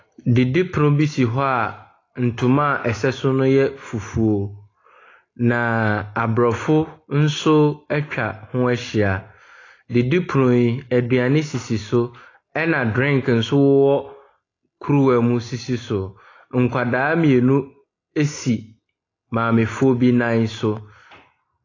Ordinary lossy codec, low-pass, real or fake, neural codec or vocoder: AAC, 32 kbps; 7.2 kHz; real; none